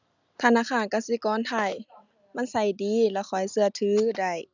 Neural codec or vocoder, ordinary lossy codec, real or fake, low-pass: none; none; real; 7.2 kHz